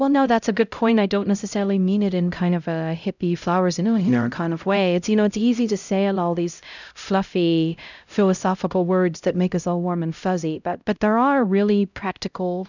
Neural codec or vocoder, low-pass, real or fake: codec, 16 kHz, 0.5 kbps, X-Codec, HuBERT features, trained on LibriSpeech; 7.2 kHz; fake